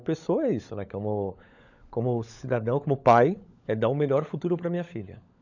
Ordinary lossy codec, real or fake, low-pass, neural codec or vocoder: none; fake; 7.2 kHz; codec, 16 kHz, 16 kbps, FunCodec, trained on LibriTTS, 50 frames a second